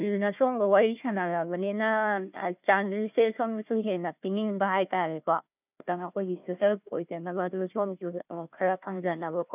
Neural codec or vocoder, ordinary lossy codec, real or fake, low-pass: codec, 16 kHz, 1 kbps, FunCodec, trained on Chinese and English, 50 frames a second; none; fake; 3.6 kHz